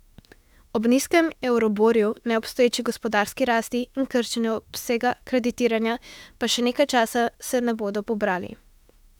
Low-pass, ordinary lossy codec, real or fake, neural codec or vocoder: 19.8 kHz; none; fake; autoencoder, 48 kHz, 32 numbers a frame, DAC-VAE, trained on Japanese speech